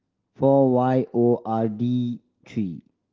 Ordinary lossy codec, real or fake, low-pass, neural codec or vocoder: Opus, 16 kbps; real; 7.2 kHz; none